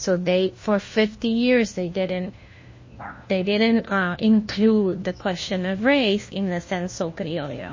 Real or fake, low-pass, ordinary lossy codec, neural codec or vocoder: fake; 7.2 kHz; MP3, 32 kbps; codec, 16 kHz, 1 kbps, FunCodec, trained on LibriTTS, 50 frames a second